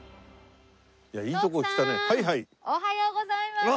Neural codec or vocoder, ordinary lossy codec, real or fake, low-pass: none; none; real; none